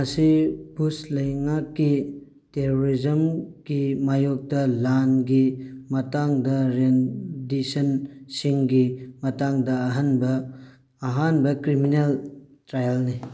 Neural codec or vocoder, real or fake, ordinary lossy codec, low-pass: none; real; none; none